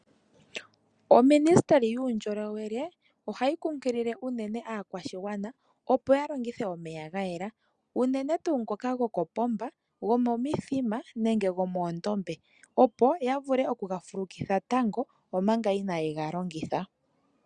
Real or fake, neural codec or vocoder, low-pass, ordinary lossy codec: real; none; 9.9 kHz; Opus, 64 kbps